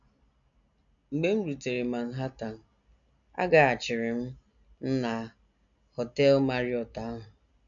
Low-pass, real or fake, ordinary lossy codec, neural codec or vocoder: 7.2 kHz; real; MP3, 96 kbps; none